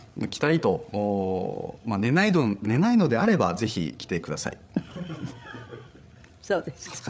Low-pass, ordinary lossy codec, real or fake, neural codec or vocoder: none; none; fake; codec, 16 kHz, 8 kbps, FreqCodec, larger model